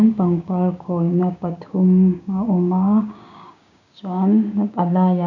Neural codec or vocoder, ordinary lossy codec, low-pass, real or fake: codec, 16 kHz, 6 kbps, DAC; none; 7.2 kHz; fake